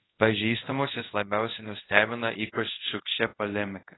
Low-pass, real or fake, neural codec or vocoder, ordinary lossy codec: 7.2 kHz; fake; codec, 24 kHz, 0.5 kbps, DualCodec; AAC, 16 kbps